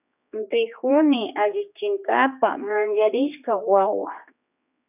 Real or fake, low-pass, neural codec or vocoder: fake; 3.6 kHz; codec, 16 kHz, 2 kbps, X-Codec, HuBERT features, trained on general audio